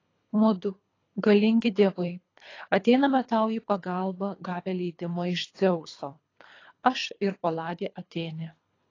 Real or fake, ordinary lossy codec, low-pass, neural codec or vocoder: fake; AAC, 32 kbps; 7.2 kHz; codec, 24 kHz, 3 kbps, HILCodec